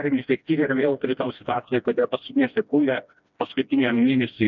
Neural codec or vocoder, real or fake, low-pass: codec, 16 kHz, 1 kbps, FreqCodec, smaller model; fake; 7.2 kHz